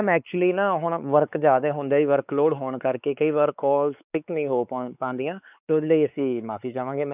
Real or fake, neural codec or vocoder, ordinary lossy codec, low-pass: fake; codec, 16 kHz, 4 kbps, X-Codec, WavLM features, trained on Multilingual LibriSpeech; none; 3.6 kHz